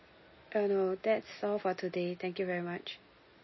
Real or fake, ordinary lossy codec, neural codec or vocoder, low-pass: real; MP3, 24 kbps; none; 7.2 kHz